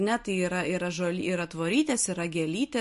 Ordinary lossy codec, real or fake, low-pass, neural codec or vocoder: MP3, 48 kbps; real; 14.4 kHz; none